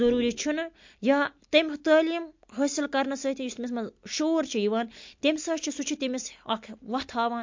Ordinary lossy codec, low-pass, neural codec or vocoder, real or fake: MP3, 48 kbps; 7.2 kHz; none; real